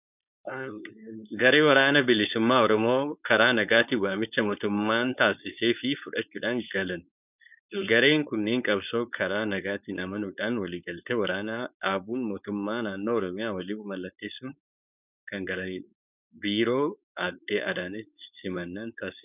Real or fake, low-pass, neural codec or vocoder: fake; 3.6 kHz; codec, 16 kHz, 4.8 kbps, FACodec